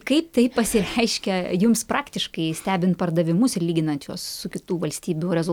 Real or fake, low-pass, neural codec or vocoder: real; 19.8 kHz; none